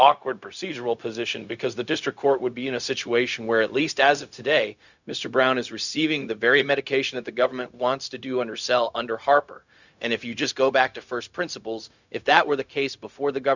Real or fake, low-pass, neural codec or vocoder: fake; 7.2 kHz; codec, 16 kHz, 0.4 kbps, LongCat-Audio-Codec